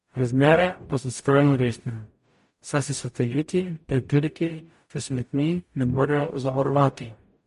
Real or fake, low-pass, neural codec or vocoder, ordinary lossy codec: fake; 14.4 kHz; codec, 44.1 kHz, 0.9 kbps, DAC; MP3, 48 kbps